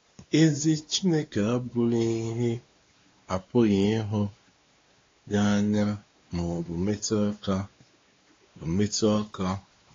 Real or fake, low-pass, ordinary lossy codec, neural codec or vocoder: fake; 7.2 kHz; AAC, 32 kbps; codec, 16 kHz, 2 kbps, X-Codec, WavLM features, trained on Multilingual LibriSpeech